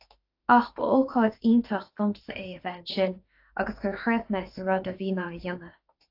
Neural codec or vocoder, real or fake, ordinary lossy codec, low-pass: autoencoder, 48 kHz, 32 numbers a frame, DAC-VAE, trained on Japanese speech; fake; AAC, 32 kbps; 5.4 kHz